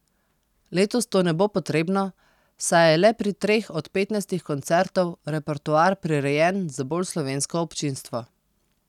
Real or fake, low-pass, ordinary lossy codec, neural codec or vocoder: real; 19.8 kHz; none; none